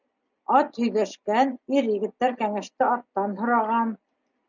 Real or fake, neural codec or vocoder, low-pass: real; none; 7.2 kHz